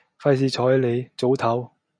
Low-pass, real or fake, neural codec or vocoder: 9.9 kHz; real; none